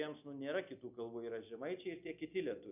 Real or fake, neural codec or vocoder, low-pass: real; none; 3.6 kHz